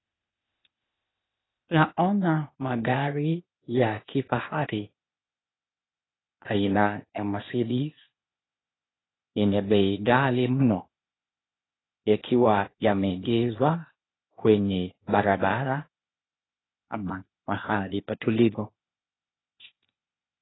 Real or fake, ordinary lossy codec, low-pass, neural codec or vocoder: fake; AAC, 16 kbps; 7.2 kHz; codec, 16 kHz, 0.8 kbps, ZipCodec